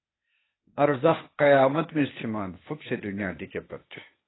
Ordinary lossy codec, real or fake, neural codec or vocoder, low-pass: AAC, 16 kbps; fake; codec, 16 kHz, 0.8 kbps, ZipCodec; 7.2 kHz